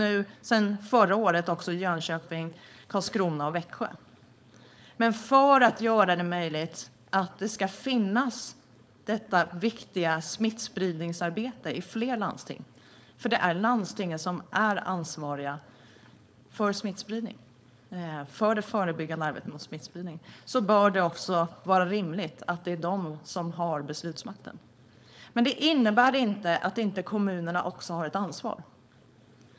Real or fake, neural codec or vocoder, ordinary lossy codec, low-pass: fake; codec, 16 kHz, 4.8 kbps, FACodec; none; none